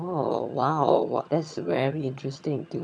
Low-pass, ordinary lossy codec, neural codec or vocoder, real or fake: none; none; vocoder, 22.05 kHz, 80 mel bands, HiFi-GAN; fake